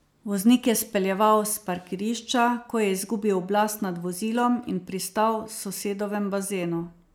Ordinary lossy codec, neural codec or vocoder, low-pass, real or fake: none; none; none; real